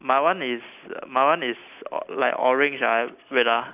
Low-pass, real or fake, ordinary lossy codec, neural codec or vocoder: 3.6 kHz; real; none; none